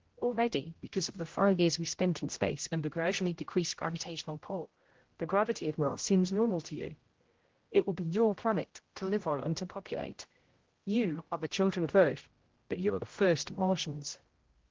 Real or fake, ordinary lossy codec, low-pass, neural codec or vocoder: fake; Opus, 16 kbps; 7.2 kHz; codec, 16 kHz, 0.5 kbps, X-Codec, HuBERT features, trained on general audio